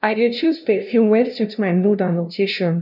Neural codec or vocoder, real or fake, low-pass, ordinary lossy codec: codec, 16 kHz, 0.5 kbps, FunCodec, trained on LibriTTS, 25 frames a second; fake; 5.4 kHz; none